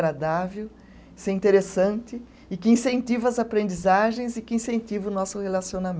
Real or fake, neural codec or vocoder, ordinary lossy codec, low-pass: real; none; none; none